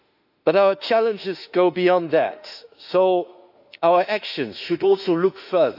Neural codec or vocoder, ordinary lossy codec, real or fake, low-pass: autoencoder, 48 kHz, 32 numbers a frame, DAC-VAE, trained on Japanese speech; none; fake; 5.4 kHz